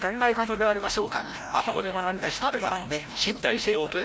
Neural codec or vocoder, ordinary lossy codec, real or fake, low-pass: codec, 16 kHz, 0.5 kbps, FreqCodec, larger model; none; fake; none